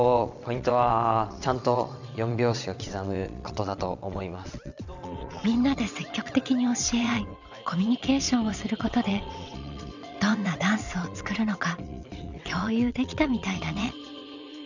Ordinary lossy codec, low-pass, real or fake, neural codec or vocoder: none; 7.2 kHz; fake; vocoder, 22.05 kHz, 80 mel bands, WaveNeXt